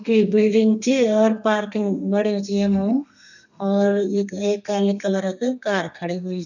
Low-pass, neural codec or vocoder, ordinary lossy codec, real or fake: 7.2 kHz; codec, 32 kHz, 1.9 kbps, SNAC; none; fake